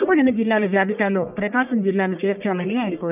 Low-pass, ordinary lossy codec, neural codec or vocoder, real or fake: 3.6 kHz; none; codec, 44.1 kHz, 1.7 kbps, Pupu-Codec; fake